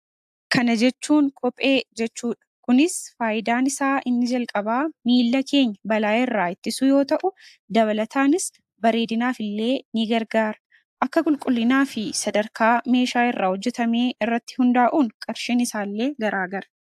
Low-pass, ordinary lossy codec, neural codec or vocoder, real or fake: 14.4 kHz; AAC, 96 kbps; none; real